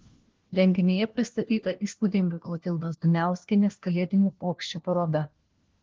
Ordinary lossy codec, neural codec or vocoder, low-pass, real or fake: Opus, 16 kbps; codec, 16 kHz, 1 kbps, FunCodec, trained on LibriTTS, 50 frames a second; 7.2 kHz; fake